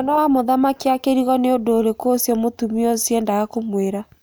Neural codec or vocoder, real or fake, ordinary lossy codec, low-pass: none; real; none; none